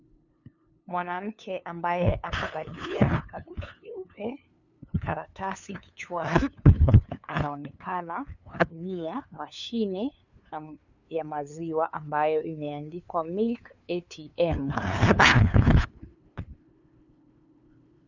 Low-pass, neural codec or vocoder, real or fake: 7.2 kHz; codec, 16 kHz, 2 kbps, FunCodec, trained on LibriTTS, 25 frames a second; fake